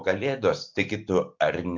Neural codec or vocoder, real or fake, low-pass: none; real; 7.2 kHz